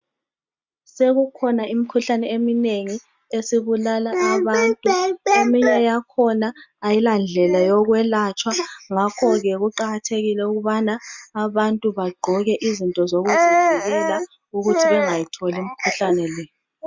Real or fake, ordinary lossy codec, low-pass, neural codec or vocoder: real; MP3, 64 kbps; 7.2 kHz; none